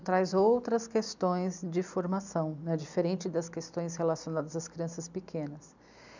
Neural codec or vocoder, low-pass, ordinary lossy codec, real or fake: none; 7.2 kHz; none; real